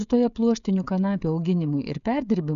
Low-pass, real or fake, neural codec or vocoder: 7.2 kHz; fake; codec, 16 kHz, 16 kbps, FreqCodec, smaller model